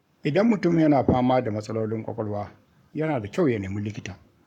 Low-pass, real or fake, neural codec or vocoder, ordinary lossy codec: 19.8 kHz; fake; codec, 44.1 kHz, 7.8 kbps, Pupu-Codec; none